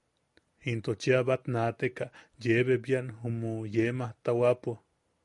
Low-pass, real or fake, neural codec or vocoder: 10.8 kHz; real; none